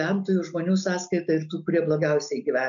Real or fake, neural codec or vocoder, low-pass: real; none; 7.2 kHz